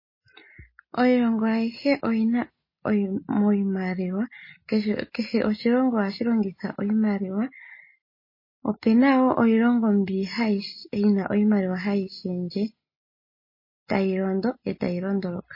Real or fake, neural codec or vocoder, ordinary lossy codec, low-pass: real; none; MP3, 24 kbps; 5.4 kHz